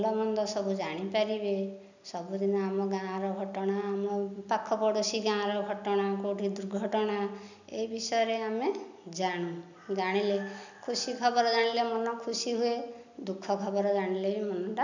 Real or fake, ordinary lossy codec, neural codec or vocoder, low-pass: real; none; none; 7.2 kHz